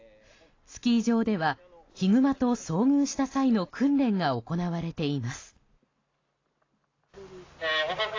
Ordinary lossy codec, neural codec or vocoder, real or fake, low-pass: AAC, 32 kbps; none; real; 7.2 kHz